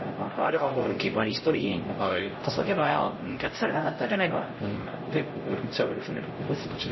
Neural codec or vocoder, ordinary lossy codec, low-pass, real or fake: codec, 16 kHz, 0.5 kbps, X-Codec, HuBERT features, trained on LibriSpeech; MP3, 24 kbps; 7.2 kHz; fake